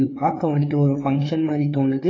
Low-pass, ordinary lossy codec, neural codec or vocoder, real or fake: 7.2 kHz; AAC, 32 kbps; codec, 16 kHz, 4 kbps, FreqCodec, larger model; fake